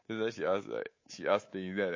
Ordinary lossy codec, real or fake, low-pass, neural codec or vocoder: MP3, 32 kbps; fake; 7.2 kHz; codec, 24 kHz, 3.1 kbps, DualCodec